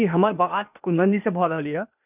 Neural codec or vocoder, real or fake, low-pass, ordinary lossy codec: codec, 16 kHz, 0.8 kbps, ZipCodec; fake; 3.6 kHz; none